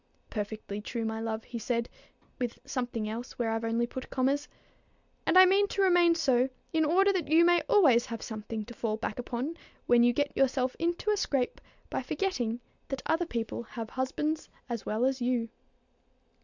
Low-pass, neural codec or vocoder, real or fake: 7.2 kHz; none; real